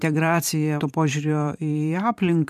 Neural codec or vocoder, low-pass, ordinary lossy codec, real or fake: vocoder, 44.1 kHz, 128 mel bands every 512 samples, BigVGAN v2; 14.4 kHz; MP3, 96 kbps; fake